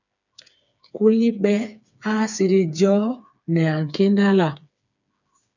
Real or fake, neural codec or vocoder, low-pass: fake; codec, 16 kHz, 4 kbps, FreqCodec, smaller model; 7.2 kHz